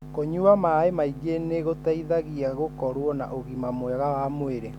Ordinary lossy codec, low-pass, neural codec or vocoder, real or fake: none; 19.8 kHz; none; real